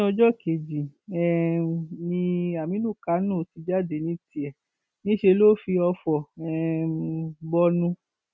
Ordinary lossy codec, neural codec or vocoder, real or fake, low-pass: none; none; real; none